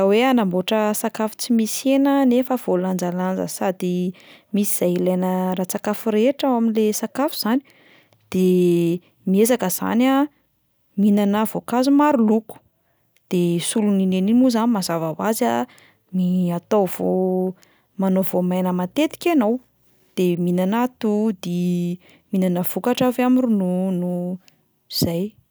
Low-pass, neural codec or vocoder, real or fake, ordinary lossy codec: none; none; real; none